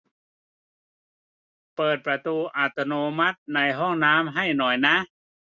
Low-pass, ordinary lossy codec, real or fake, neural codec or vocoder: 7.2 kHz; none; real; none